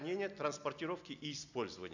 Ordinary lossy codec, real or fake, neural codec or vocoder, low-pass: none; real; none; 7.2 kHz